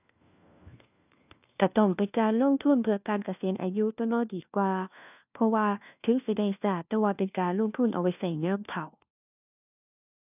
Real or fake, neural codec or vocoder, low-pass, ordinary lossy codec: fake; codec, 16 kHz, 1 kbps, FunCodec, trained on LibriTTS, 50 frames a second; 3.6 kHz; none